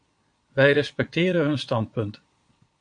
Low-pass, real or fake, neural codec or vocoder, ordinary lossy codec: 9.9 kHz; fake; vocoder, 22.05 kHz, 80 mel bands, Vocos; AAC, 64 kbps